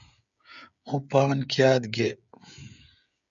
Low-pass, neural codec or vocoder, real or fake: 7.2 kHz; codec, 16 kHz, 16 kbps, FreqCodec, smaller model; fake